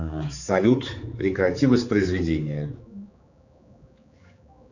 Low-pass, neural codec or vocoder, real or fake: 7.2 kHz; codec, 16 kHz, 4 kbps, X-Codec, HuBERT features, trained on general audio; fake